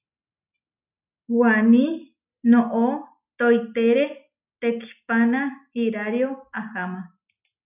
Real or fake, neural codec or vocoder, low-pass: real; none; 3.6 kHz